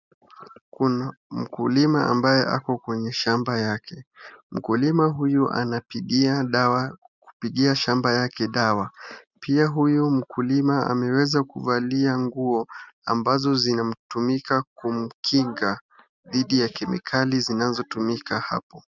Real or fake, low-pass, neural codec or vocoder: real; 7.2 kHz; none